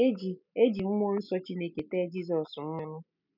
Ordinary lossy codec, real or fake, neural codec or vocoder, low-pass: none; real; none; 5.4 kHz